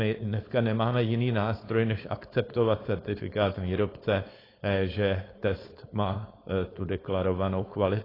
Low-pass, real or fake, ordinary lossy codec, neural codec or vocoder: 5.4 kHz; fake; AAC, 24 kbps; codec, 16 kHz, 4.8 kbps, FACodec